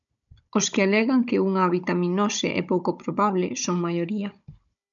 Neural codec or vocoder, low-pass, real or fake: codec, 16 kHz, 16 kbps, FunCodec, trained on Chinese and English, 50 frames a second; 7.2 kHz; fake